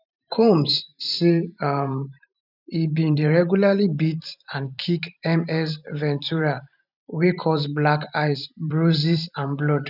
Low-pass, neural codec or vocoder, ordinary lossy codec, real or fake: 5.4 kHz; vocoder, 44.1 kHz, 128 mel bands every 512 samples, BigVGAN v2; none; fake